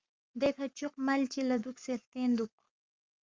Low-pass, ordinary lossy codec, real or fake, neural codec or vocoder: 7.2 kHz; Opus, 32 kbps; fake; codec, 44.1 kHz, 7.8 kbps, Pupu-Codec